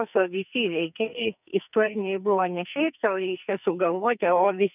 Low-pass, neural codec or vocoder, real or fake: 3.6 kHz; codec, 44.1 kHz, 2.6 kbps, SNAC; fake